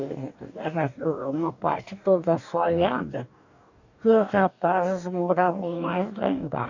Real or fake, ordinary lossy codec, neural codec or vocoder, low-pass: fake; none; codec, 44.1 kHz, 2.6 kbps, DAC; 7.2 kHz